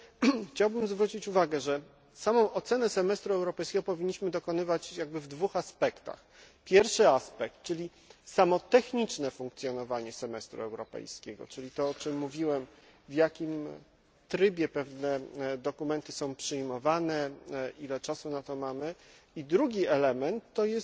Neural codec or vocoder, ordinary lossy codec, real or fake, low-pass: none; none; real; none